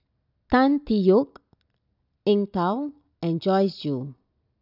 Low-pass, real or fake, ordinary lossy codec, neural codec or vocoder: 5.4 kHz; real; none; none